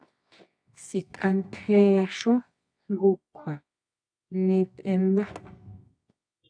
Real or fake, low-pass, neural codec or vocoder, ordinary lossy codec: fake; 9.9 kHz; codec, 24 kHz, 0.9 kbps, WavTokenizer, medium music audio release; AAC, 64 kbps